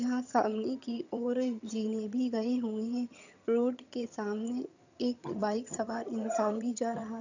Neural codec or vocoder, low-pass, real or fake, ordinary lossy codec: vocoder, 22.05 kHz, 80 mel bands, HiFi-GAN; 7.2 kHz; fake; none